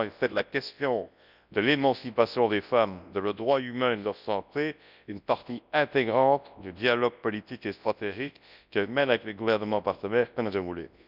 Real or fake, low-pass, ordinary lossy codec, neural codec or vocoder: fake; 5.4 kHz; none; codec, 24 kHz, 0.9 kbps, WavTokenizer, large speech release